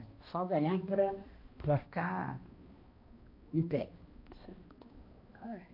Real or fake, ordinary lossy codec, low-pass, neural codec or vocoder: fake; MP3, 32 kbps; 5.4 kHz; codec, 16 kHz, 1 kbps, X-Codec, HuBERT features, trained on balanced general audio